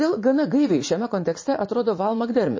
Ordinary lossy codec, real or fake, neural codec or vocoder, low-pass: MP3, 32 kbps; fake; vocoder, 44.1 kHz, 80 mel bands, Vocos; 7.2 kHz